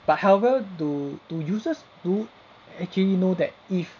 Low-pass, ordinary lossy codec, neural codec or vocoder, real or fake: 7.2 kHz; none; none; real